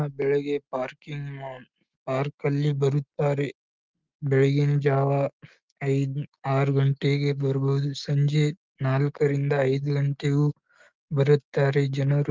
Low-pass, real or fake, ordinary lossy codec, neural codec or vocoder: 7.2 kHz; real; Opus, 32 kbps; none